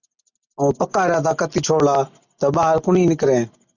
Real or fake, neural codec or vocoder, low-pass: real; none; 7.2 kHz